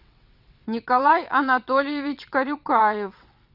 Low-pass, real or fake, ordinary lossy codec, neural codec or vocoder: 5.4 kHz; fake; Opus, 64 kbps; vocoder, 22.05 kHz, 80 mel bands, WaveNeXt